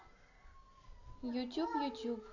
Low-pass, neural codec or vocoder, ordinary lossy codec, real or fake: 7.2 kHz; none; none; real